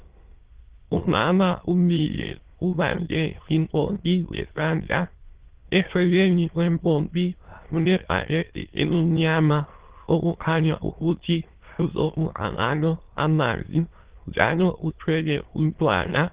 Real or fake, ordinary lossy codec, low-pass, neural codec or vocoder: fake; Opus, 16 kbps; 3.6 kHz; autoencoder, 22.05 kHz, a latent of 192 numbers a frame, VITS, trained on many speakers